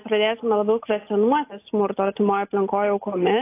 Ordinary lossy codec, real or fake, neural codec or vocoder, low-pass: AAC, 24 kbps; real; none; 3.6 kHz